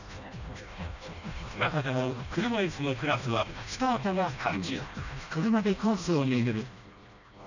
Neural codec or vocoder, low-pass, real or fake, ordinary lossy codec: codec, 16 kHz, 1 kbps, FreqCodec, smaller model; 7.2 kHz; fake; none